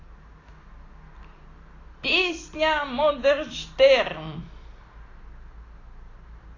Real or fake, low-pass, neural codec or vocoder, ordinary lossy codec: real; 7.2 kHz; none; AAC, 32 kbps